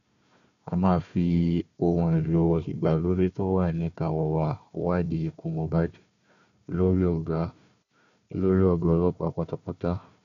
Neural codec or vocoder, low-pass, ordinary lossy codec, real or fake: codec, 16 kHz, 1 kbps, FunCodec, trained on Chinese and English, 50 frames a second; 7.2 kHz; none; fake